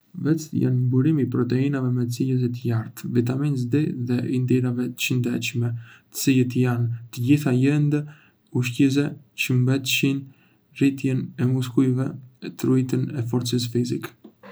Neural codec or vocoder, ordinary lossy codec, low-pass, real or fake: none; none; none; real